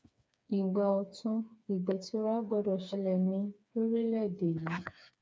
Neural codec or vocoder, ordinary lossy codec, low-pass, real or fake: codec, 16 kHz, 4 kbps, FreqCodec, smaller model; none; none; fake